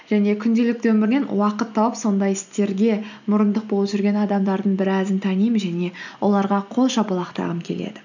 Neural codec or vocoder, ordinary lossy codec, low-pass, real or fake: none; none; 7.2 kHz; real